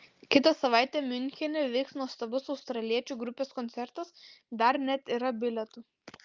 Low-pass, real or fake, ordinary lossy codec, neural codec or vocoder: 7.2 kHz; real; Opus, 32 kbps; none